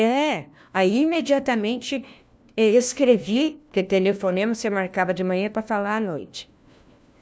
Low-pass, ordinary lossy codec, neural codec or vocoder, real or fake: none; none; codec, 16 kHz, 1 kbps, FunCodec, trained on LibriTTS, 50 frames a second; fake